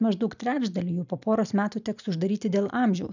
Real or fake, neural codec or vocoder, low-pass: real; none; 7.2 kHz